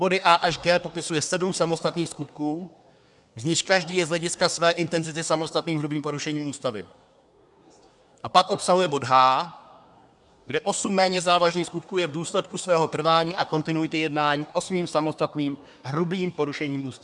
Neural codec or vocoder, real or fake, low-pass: codec, 24 kHz, 1 kbps, SNAC; fake; 10.8 kHz